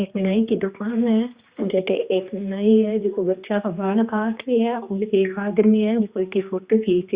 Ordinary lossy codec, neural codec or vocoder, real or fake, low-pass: Opus, 64 kbps; codec, 16 kHz, 2 kbps, X-Codec, HuBERT features, trained on general audio; fake; 3.6 kHz